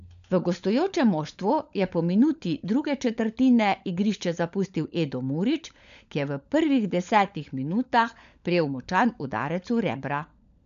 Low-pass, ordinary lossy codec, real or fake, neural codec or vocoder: 7.2 kHz; none; fake; codec, 16 kHz, 16 kbps, FunCodec, trained on LibriTTS, 50 frames a second